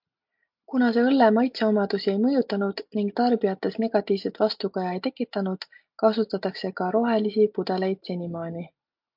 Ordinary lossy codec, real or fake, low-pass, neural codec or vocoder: MP3, 48 kbps; real; 5.4 kHz; none